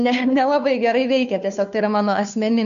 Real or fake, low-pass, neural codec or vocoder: fake; 7.2 kHz; codec, 16 kHz, 2 kbps, FunCodec, trained on Chinese and English, 25 frames a second